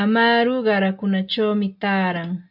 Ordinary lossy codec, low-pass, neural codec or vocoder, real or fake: AAC, 48 kbps; 5.4 kHz; none; real